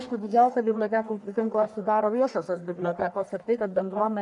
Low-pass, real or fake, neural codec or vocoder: 10.8 kHz; fake; codec, 44.1 kHz, 1.7 kbps, Pupu-Codec